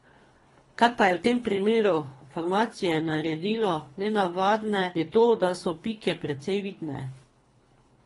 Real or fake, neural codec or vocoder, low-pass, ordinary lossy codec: fake; codec, 24 kHz, 3 kbps, HILCodec; 10.8 kHz; AAC, 32 kbps